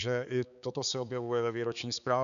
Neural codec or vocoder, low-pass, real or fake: codec, 16 kHz, 4 kbps, X-Codec, HuBERT features, trained on balanced general audio; 7.2 kHz; fake